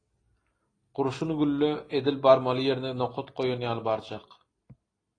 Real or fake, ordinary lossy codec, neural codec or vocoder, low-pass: real; AAC, 32 kbps; none; 9.9 kHz